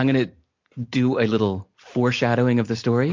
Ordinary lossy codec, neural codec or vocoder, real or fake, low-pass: MP3, 48 kbps; none; real; 7.2 kHz